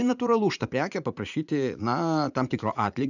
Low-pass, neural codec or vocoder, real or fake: 7.2 kHz; vocoder, 44.1 kHz, 80 mel bands, Vocos; fake